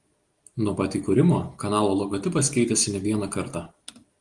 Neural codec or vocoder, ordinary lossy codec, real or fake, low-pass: none; Opus, 24 kbps; real; 10.8 kHz